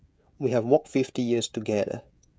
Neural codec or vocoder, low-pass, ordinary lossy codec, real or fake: codec, 16 kHz, 16 kbps, FreqCodec, smaller model; none; none; fake